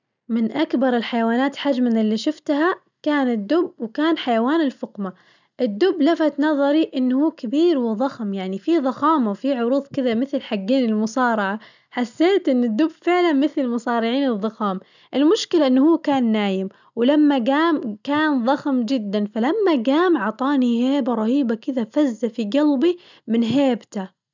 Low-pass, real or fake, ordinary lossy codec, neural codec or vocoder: 7.2 kHz; real; none; none